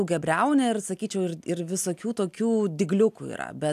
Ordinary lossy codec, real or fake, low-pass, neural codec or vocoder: AAC, 96 kbps; real; 14.4 kHz; none